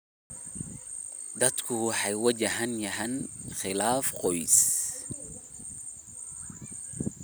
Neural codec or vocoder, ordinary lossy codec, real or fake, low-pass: none; none; real; none